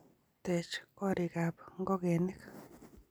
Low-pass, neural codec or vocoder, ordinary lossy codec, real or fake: none; none; none; real